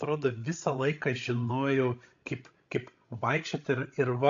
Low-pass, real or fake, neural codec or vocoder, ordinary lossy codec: 7.2 kHz; fake; codec, 16 kHz, 16 kbps, FreqCodec, larger model; AAC, 48 kbps